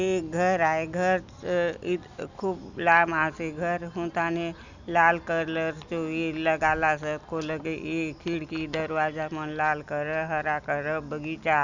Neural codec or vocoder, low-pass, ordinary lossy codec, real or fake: none; 7.2 kHz; none; real